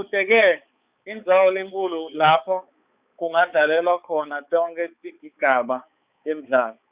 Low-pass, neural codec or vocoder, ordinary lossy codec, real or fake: 3.6 kHz; codec, 16 kHz, 4 kbps, X-Codec, HuBERT features, trained on balanced general audio; Opus, 64 kbps; fake